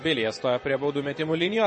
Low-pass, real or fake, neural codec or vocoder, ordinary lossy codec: 10.8 kHz; fake; vocoder, 24 kHz, 100 mel bands, Vocos; MP3, 32 kbps